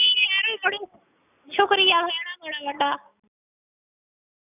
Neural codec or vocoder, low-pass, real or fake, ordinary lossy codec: none; 3.6 kHz; real; none